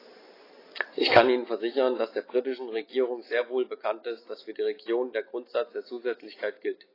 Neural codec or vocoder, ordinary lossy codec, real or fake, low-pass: none; AAC, 24 kbps; real; 5.4 kHz